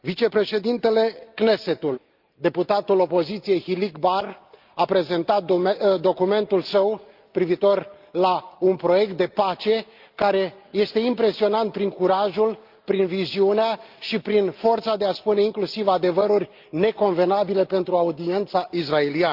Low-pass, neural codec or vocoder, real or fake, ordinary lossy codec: 5.4 kHz; none; real; Opus, 32 kbps